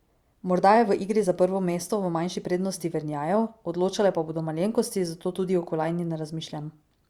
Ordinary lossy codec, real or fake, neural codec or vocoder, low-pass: Opus, 64 kbps; fake; vocoder, 44.1 kHz, 128 mel bands every 512 samples, BigVGAN v2; 19.8 kHz